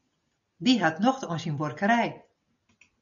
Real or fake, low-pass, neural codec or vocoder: real; 7.2 kHz; none